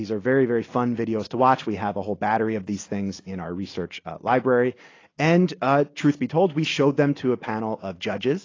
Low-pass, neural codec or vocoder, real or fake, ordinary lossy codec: 7.2 kHz; none; real; AAC, 32 kbps